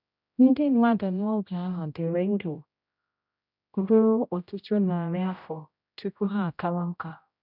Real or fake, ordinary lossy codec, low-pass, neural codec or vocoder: fake; none; 5.4 kHz; codec, 16 kHz, 0.5 kbps, X-Codec, HuBERT features, trained on general audio